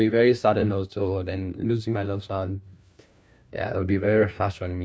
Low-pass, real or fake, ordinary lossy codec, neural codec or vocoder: none; fake; none; codec, 16 kHz, 1 kbps, FunCodec, trained on LibriTTS, 50 frames a second